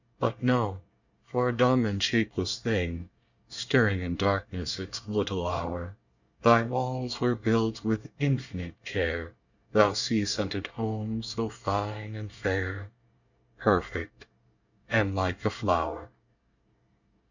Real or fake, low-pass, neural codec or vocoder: fake; 7.2 kHz; codec, 24 kHz, 1 kbps, SNAC